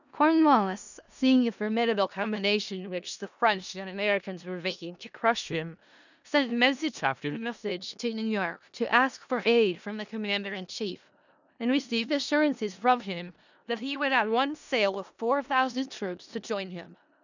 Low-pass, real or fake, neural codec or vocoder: 7.2 kHz; fake; codec, 16 kHz in and 24 kHz out, 0.4 kbps, LongCat-Audio-Codec, four codebook decoder